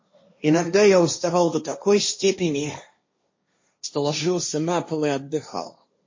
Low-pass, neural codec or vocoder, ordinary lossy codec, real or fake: 7.2 kHz; codec, 16 kHz, 1.1 kbps, Voila-Tokenizer; MP3, 32 kbps; fake